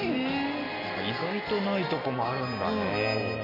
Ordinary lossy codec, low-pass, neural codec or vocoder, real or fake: none; 5.4 kHz; none; real